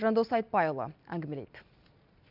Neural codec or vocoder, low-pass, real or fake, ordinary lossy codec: none; 5.4 kHz; real; none